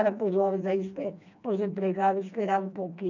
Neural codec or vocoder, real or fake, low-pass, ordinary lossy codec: codec, 16 kHz, 2 kbps, FreqCodec, smaller model; fake; 7.2 kHz; none